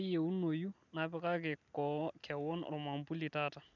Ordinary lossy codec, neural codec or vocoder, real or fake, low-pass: none; none; real; 7.2 kHz